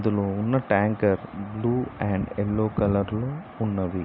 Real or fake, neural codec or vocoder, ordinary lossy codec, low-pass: real; none; none; 5.4 kHz